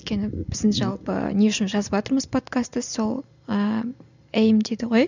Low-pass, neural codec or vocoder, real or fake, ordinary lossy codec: 7.2 kHz; none; real; none